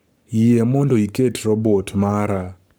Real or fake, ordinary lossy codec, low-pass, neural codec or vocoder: fake; none; none; codec, 44.1 kHz, 7.8 kbps, Pupu-Codec